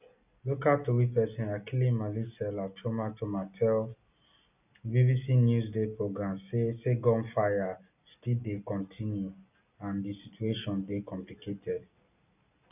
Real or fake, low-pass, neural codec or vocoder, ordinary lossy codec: real; 3.6 kHz; none; none